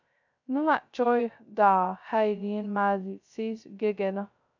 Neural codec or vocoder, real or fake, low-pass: codec, 16 kHz, 0.2 kbps, FocalCodec; fake; 7.2 kHz